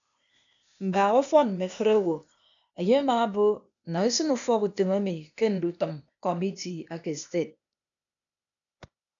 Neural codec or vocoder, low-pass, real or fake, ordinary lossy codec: codec, 16 kHz, 0.8 kbps, ZipCodec; 7.2 kHz; fake; MP3, 96 kbps